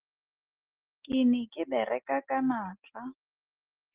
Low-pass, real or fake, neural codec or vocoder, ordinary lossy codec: 3.6 kHz; real; none; Opus, 16 kbps